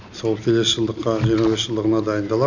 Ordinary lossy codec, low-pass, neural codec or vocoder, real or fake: none; 7.2 kHz; none; real